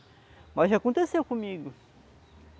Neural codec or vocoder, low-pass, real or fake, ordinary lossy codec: none; none; real; none